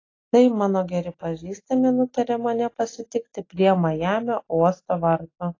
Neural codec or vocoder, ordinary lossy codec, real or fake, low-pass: none; AAC, 32 kbps; real; 7.2 kHz